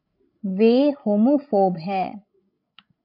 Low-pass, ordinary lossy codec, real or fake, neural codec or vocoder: 5.4 kHz; MP3, 48 kbps; fake; codec, 16 kHz, 16 kbps, FreqCodec, larger model